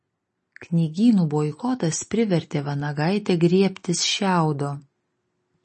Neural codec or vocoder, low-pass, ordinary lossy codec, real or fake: none; 10.8 kHz; MP3, 32 kbps; real